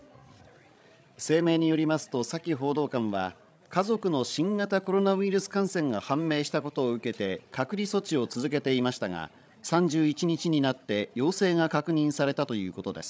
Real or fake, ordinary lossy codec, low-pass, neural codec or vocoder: fake; none; none; codec, 16 kHz, 16 kbps, FreqCodec, larger model